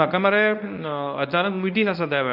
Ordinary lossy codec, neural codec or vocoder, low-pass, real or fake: none; codec, 24 kHz, 0.9 kbps, WavTokenizer, medium speech release version 1; 5.4 kHz; fake